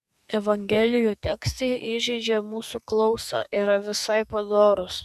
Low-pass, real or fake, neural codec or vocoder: 14.4 kHz; fake; codec, 44.1 kHz, 2.6 kbps, SNAC